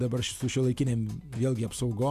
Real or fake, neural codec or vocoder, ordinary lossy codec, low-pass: real; none; MP3, 96 kbps; 14.4 kHz